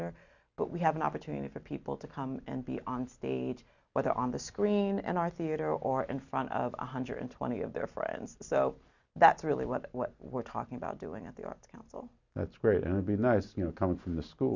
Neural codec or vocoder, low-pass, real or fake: none; 7.2 kHz; real